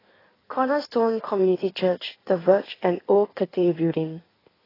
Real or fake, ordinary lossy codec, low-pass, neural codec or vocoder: fake; AAC, 24 kbps; 5.4 kHz; codec, 16 kHz in and 24 kHz out, 1.1 kbps, FireRedTTS-2 codec